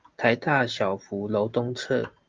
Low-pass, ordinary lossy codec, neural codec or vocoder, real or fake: 7.2 kHz; Opus, 24 kbps; none; real